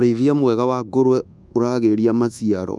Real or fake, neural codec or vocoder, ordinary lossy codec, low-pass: fake; codec, 24 kHz, 1.2 kbps, DualCodec; none; none